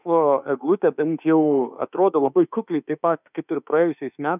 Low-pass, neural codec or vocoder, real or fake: 3.6 kHz; autoencoder, 48 kHz, 32 numbers a frame, DAC-VAE, trained on Japanese speech; fake